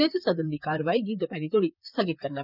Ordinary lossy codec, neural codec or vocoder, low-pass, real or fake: none; vocoder, 44.1 kHz, 128 mel bands, Pupu-Vocoder; 5.4 kHz; fake